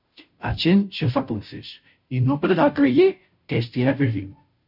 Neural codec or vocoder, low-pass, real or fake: codec, 16 kHz, 0.5 kbps, FunCodec, trained on Chinese and English, 25 frames a second; 5.4 kHz; fake